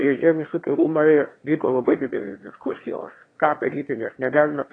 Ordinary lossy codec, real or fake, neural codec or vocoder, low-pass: AAC, 32 kbps; fake; autoencoder, 22.05 kHz, a latent of 192 numbers a frame, VITS, trained on one speaker; 9.9 kHz